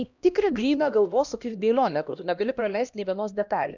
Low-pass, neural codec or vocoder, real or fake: 7.2 kHz; codec, 16 kHz, 1 kbps, X-Codec, HuBERT features, trained on LibriSpeech; fake